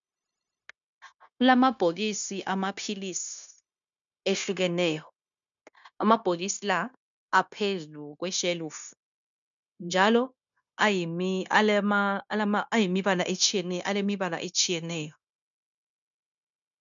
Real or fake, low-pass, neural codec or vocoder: fake; 7.2 kHz; codec, 16 kHz, 0.9 kbps, LongCat-Audio-Codec